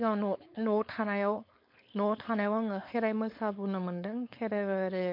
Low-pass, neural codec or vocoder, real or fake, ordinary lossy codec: 5.4 kHz; codec, 16 kHz, 4.8 kbps, FACodec; fake; MP3, 32 kbps